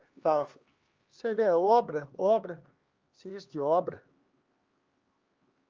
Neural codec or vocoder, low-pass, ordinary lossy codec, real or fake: codec, 16 kHz, 2 kbps, X-Codec, HuBERT features, trained on LibriSpeech; 7.2 kHz; Opus, 32 kbps; fake